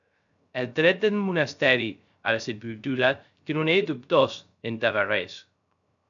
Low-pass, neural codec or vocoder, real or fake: 7.2 kHz; codec, 16 kHz, 0.3 kbps, FocalCodec; fake